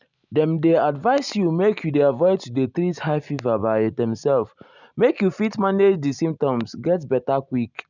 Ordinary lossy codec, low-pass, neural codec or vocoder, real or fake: none; 7.2 kHz; none; real